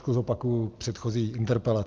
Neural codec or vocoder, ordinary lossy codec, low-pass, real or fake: none; Opus, 32 kbps; 7.2 kHz; real